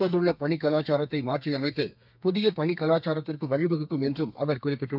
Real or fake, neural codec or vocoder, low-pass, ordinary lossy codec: fake; codec, 32 kHz, 1.9 kbps, SNAC; 5.4 kHz; none